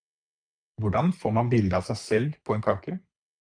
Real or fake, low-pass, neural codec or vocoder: fake; 9.9 kHz; codec, 24 kHz, 3 kbps, HILCodec